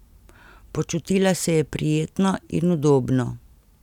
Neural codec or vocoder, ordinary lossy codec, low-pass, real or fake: none; none; 19.8 kHz; real